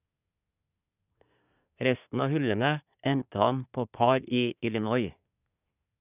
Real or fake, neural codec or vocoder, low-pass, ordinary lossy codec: fake; codec, 24 kHz, 1 kbps, SNAC; 3.6 kHz; none